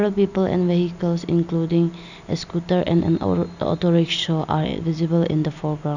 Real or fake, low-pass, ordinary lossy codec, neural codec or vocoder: real; 7.2 kHz; none; none